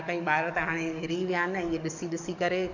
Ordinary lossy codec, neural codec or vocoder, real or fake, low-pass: none; codec, 44.1 kHz, 7.8 kbps, DAC; fake; 7.2 kHz